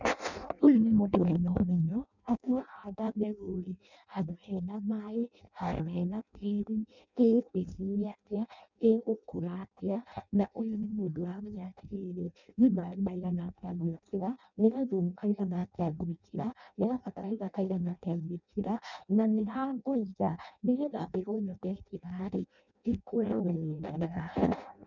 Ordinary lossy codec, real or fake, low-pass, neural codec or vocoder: none; fake; 7.2 kHz; codec, 16 kHz in and 24 kHz out, 0.6 kbps, FireRedTTS-2 codec